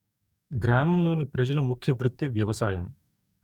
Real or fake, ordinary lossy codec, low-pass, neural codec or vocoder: fake; none; 19.8 kHz; codec, 44.1 kHz, 2.6 kbps, DAC